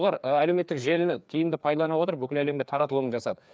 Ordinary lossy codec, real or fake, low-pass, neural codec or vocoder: none; fake; none; codec, 16 kHz, 2 kbps, FreqCodec, larger model